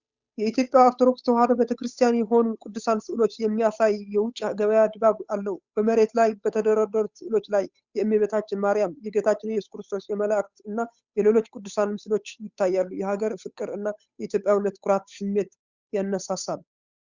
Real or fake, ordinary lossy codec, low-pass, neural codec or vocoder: fake; Opus, 64 kbps; 7.2 kHz; codec, 16 kHz, 8 kbps, FunCodec, trained on Chinese and English, 25 frames a second